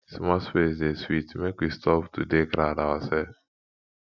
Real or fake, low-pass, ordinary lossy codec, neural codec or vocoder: real; 7.2 kHz; none; none